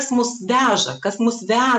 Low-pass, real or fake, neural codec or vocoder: 9.9 kHz; real; none